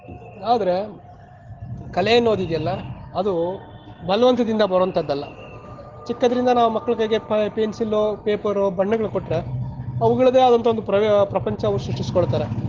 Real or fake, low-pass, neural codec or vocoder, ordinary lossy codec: real; 7.2 kHz; none; Opus, 16 kbps